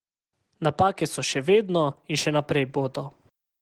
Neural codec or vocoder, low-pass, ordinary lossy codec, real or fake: none; 19.8 kHz; Opus, 16 kbps; real